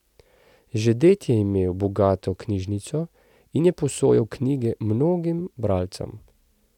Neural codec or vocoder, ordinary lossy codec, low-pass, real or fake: none; none; 19.8 kHz; real